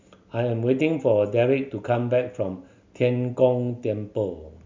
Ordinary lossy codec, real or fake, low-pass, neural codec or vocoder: MP3, 64 kbps; real; 7.2 kHz; none